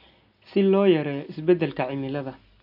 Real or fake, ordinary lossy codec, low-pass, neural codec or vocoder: real; none; 5.4 kHz; none